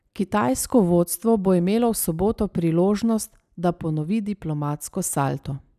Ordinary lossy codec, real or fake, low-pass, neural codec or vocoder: none; real; 14.4 kHz; none